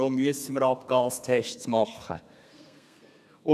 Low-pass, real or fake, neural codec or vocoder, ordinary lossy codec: 14.4 kHz; fake; codec, 32 kHz, 1.9 kbps, SNAC; none